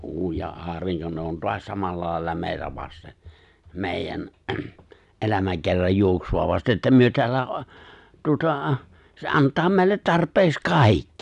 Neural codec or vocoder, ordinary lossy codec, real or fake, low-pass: none; none; real; 10.8 kHz